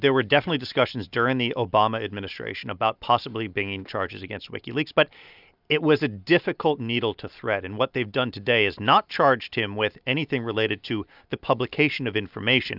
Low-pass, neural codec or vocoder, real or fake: 5.4 kHz; none; real